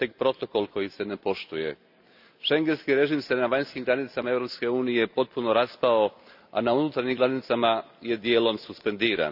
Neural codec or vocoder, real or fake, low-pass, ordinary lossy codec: none; real; 5.4 kHz; none